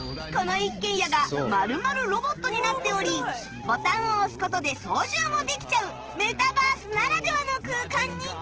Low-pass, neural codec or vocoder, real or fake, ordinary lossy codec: 7.2 kHz; none; real; Opus, 16 kbps